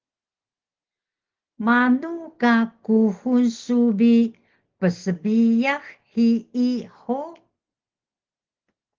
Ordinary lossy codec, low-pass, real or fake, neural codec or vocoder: Opus, 16 kbps; 7.2 kHz; real; none